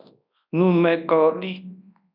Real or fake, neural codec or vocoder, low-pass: fake; codec, 24 kHz, 0.9 kbps, WavTokenizer, large speech release; 5.4 kHz